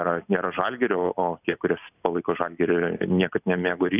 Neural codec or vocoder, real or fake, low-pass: none; real; 3.6 kHz